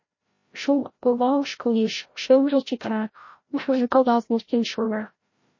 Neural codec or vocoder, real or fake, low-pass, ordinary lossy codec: codec, 16 kHz, 0.5 kbps, FreqCodec, larger model; fake; 7.2 kHz; MP3, 32 kbps